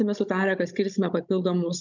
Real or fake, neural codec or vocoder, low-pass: fake; codec, 16 kHz, 16 kbps, FunCodec, trained on Chinese and English, 50 frames a second; 7.2 kHz